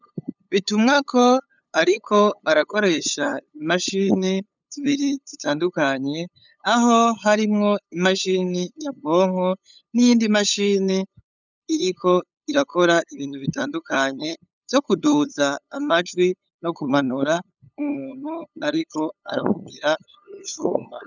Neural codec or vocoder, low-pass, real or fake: codec, 16 kHz, 8 kbps, FunCodec, trained on LibriTTS, 25 frames a second; 7.2 kHz; fake